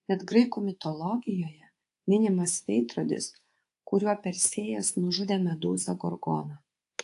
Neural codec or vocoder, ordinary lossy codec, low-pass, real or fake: codec, 24 kHz, 3.1 kbps, DualCodec; AAC, 48 kbps; 10.8 kHz; fake